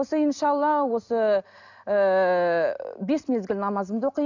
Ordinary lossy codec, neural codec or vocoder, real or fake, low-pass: none; none; real; 7.2 kHz